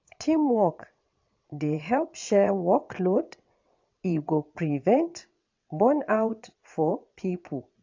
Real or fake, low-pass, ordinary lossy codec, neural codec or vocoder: fake; 7.2 kHz; AAC, 48 kbps; vocoder, 22.05 kHz, 80 mel bands, WaveNeXt